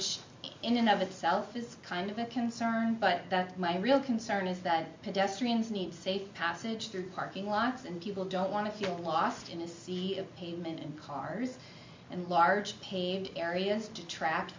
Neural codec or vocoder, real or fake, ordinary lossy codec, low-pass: none; real; MP3, 48 kbps; 7.2 kHz